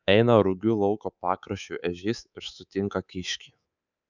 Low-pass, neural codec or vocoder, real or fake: 7.2 kHz; codec, 24 kHz, 3.1 kbps, DualCodec; fake